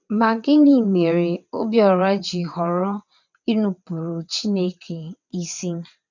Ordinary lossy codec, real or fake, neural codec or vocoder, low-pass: none; fake; vocoder, 22.05 kHz, 80 mel bands, WaveNeXt; 7.2 kHz